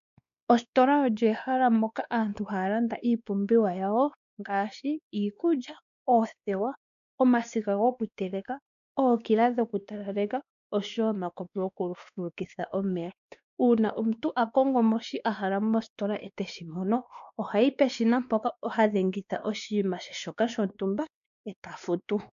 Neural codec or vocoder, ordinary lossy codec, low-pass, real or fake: codec, 16 kHz, 2 kbps, X-Codec, WavLM features, trained on Multilingual LibriSpeech; MP3, 96 kbps; 7.2 kHz; fake